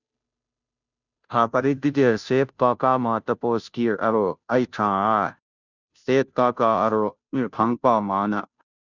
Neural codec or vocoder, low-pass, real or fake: codec, 16 kHz, 0.5 kbps, FunCodec, trained on Chinese and English, 25 frames a second; 7.2 kHz; fake